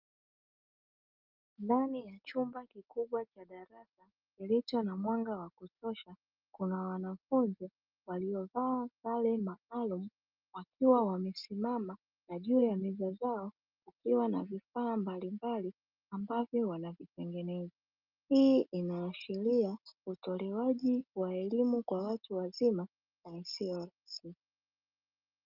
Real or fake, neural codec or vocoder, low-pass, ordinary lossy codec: real; none; 5.4 kHz; Opus, 24 kbps